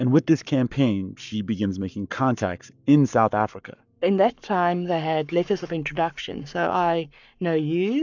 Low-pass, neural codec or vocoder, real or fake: 7.2 kHz; codec, 44.1 kHz, 7.8 kbps, Pupu-Codec; fake